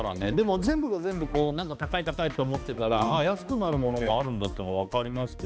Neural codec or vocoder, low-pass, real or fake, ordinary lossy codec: codec, 16 kHz, 2 kbps, X-Codec, HuBERT features, trained on balanced general audio; none; fake; none